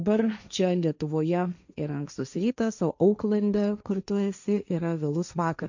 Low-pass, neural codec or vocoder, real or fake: 7.2 kHz; codec, 16 kHz, 1.1 kbps, Voila-Tokenizer; fake